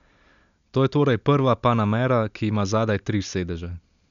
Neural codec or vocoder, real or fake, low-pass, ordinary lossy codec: none; real; 7.2 kHz; none